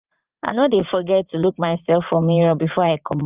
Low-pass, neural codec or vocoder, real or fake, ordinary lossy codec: 3.6 kHz; vocoder, 44.1 kHz, 80 mel bands, Vocos; fake; Opus, 24 kbps